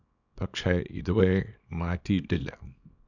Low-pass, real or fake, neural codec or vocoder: 7.2 kHz; fake; codec, 24 kHz, 0.9 kbps, WavTokenizer, small release